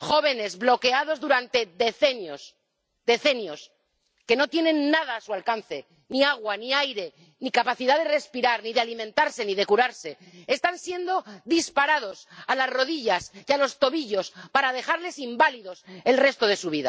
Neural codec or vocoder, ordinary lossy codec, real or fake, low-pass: none; none; real; none